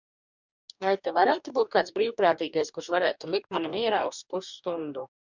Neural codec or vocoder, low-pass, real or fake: codec, 44.1 kHz, 2.6 kbps, DAC; 7.2 kHz; fake